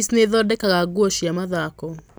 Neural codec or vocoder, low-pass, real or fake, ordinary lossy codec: none; none; real; none